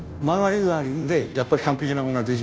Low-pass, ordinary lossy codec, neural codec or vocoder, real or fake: none; none; codec, 16 kHz, 0.5 kbps, FunCodec, trained on Chinese and English, 25 frames a second; fake